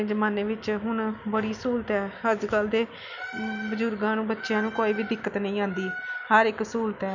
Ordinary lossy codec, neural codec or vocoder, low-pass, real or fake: none; none; 7.2 kHz; real